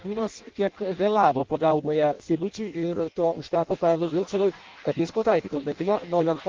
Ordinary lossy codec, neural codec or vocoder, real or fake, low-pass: Opus, 32 kbps; codec, 16 kHz in and 24 kHz out, 0.6 kbps, FireRedTTS-2 codec; fake; 7.2 kHz